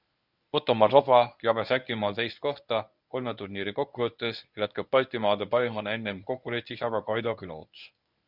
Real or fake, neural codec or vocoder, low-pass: fake; codec, 24 kHz, 0.9 kbps, WavTokenizer, medium speech release version 1; 5.4 kHz